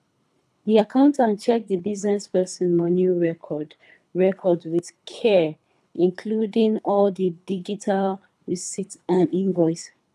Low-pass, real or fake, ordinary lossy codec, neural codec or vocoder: none; fake; none; codec, 24 kHz, 3 kbps, HILCodec